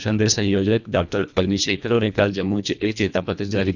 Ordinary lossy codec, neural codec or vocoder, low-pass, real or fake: none; codec, 24 kHz, 1.5 kbps, HILCodec; 7.2 kHz; fake